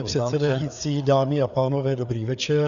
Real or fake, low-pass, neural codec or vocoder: fake; 7.2 kHz; codec, 16 kHz, 4 kbps, FreqCodec, larger model